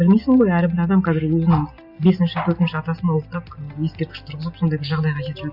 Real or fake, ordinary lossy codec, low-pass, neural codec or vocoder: real; Opus, 64 kbps; 5.4 kHz; none